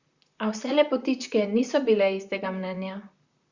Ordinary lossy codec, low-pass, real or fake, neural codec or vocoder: Opus, 64 kbps; 7.2 kHz; fake; vocoder, 44.1 kHz, 128 mel bands, Pupu-Vocoder